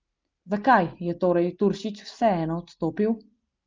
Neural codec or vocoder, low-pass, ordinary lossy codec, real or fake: none; 7.2 kHz; Opus, 32 kbps; real